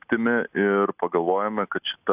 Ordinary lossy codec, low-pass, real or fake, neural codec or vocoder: AAC, 32 kbps; 3.6 kHz; real; none